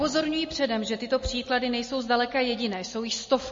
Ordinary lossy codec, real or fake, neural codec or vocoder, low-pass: MP3, 32 kbps; real; none; 7.2 kHz